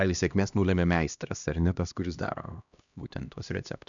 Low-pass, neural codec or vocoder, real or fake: 7.2 kHz; codec, 16 kHz, 1 kbps, X-Codec, HuBERT features, trained on LibriSpeech; fake